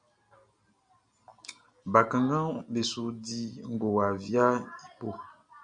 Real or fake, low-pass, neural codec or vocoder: real; 9.9 kHz; none